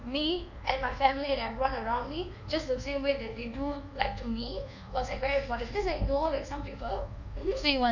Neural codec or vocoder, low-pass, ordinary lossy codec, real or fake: codec, 24 kHz, 1.2 kbps, DualCodec; 7.2 kHz; none; fake